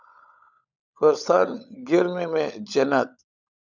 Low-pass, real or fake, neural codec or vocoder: 7.2 kHz; fake; codec, 16 kHz, 16 kbps, FunCodec, trained on LibriTTS, 50 frames a second